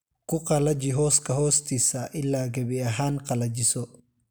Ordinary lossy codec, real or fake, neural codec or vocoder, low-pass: none; real; none; none